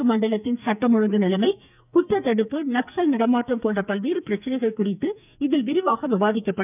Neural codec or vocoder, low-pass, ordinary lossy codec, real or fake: codec, 44.1 kHz, 2.6 kbps, SNAC; 3.6 kHz; none; fake